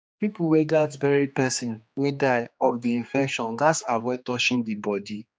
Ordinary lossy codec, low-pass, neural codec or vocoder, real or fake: none; none; codec, 16 kHz, 2 kbps, X-Codec, HuBERT features, trained on general audio; fake